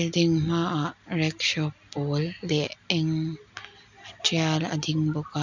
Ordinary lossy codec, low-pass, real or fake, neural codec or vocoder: none; 7.2 kHz; real; none